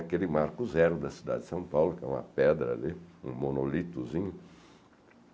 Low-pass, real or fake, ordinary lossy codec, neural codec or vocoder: none; real; none; none